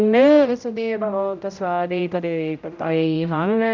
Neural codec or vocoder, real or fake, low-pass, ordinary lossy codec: codec, 16 kHz, 0.5 kbps, X-Codec, HuBERT features, trained on general audio; fake; 7.2 kHz; none